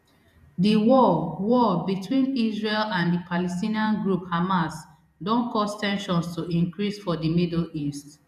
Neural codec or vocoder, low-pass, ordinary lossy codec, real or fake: vocoder, 48 kHz, 128 mel bands, Vocos; 14.4 kHz; none; fake